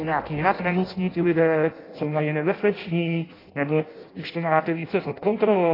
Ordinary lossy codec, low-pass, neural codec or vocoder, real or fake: AAC, 24 kbps; 5.4 kHz; codec, 16 kHz in and 24 kHz out, 0.6 kbps, FireRedTTS-2 codec; fake